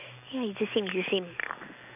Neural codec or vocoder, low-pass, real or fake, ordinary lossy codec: none; 3.6 kHz; real; none